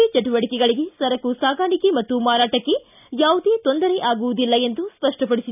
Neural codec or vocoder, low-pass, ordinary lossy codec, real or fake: none; 3.6 kHz; none; real